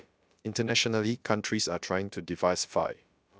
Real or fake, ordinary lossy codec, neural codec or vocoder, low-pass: fake; none; codec, 16 kHz, about 1 kbps, DyCAST, with the encoder's durations; none